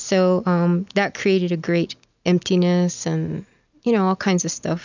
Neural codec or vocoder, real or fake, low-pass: none; real; 7.2 kHz